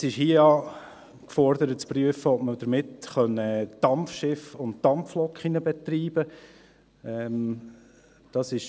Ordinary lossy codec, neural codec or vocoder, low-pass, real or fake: none; none; none; real